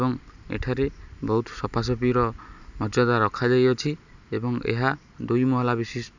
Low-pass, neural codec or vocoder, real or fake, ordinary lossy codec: 7.2 kHz; none; real; none